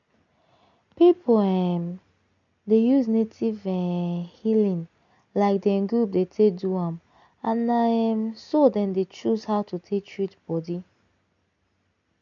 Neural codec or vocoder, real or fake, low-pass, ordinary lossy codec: none; real; 7.2 kHz; none